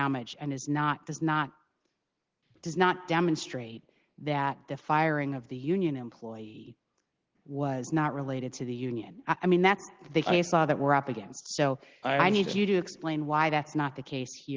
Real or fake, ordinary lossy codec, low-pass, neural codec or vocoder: real; Opus, 16 kbps; 7.2 kHz; none